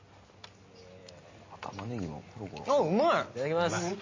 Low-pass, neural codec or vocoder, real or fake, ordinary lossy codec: 7.2 kHz; none; real; MP3, 32 kbps